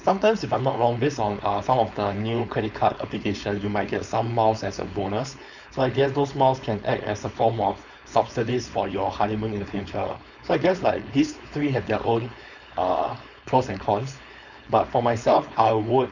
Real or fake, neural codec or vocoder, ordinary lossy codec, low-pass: fake; codec, 16 kHz, 4.8 kbps, FACodec; none; 7.2 kHz